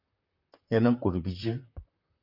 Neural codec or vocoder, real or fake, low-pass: vocoder, 44.1 kHz, 128 mel bands, Pupu-Vocoder; fake; 5.4 kHz